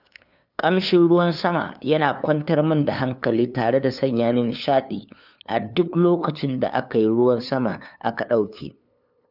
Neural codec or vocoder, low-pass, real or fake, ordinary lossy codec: codec, 16 kHz, 2 kbps, FunCodec, trained on LibriTTS, 25 frames a second; 5.4 kHz; fake; none